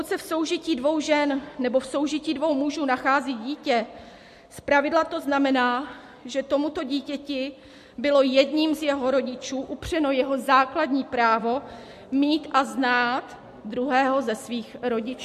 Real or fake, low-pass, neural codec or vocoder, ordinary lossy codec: real; 14.4 kHz; none; MP3, 64 kbps